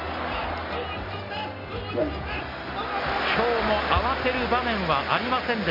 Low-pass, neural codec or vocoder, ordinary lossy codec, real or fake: 5.4 kHz; none; MP3, 32 kbps; real